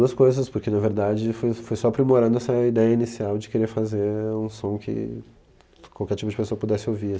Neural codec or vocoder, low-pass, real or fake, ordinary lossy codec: none; none; real; none